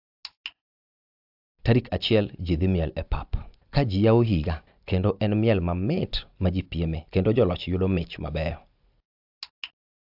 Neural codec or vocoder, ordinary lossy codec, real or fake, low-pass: none; AAC, 48 kbps; real; 5.4 kHz